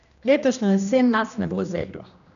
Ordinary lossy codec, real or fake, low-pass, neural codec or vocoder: none; fake; 7.2 kHz; codec, 16 kHz, 1 kbps, X-Codec, HuBERT features, trained on general audio